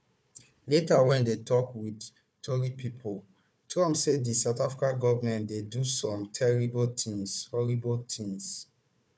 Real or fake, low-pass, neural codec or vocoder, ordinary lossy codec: fake; none; codec, 16 kHz, 4 kbps, FunCodec, trained on Chinese and English, 50 frames a second; none